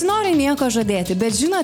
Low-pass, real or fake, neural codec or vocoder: 19.8 kHz; real; none